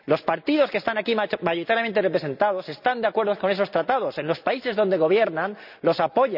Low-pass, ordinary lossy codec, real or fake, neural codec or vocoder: 5.4 kHz; none; real; none